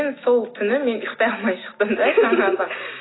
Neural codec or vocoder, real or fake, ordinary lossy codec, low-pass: none; real; AAC, 16 kbps; 7.2 kHz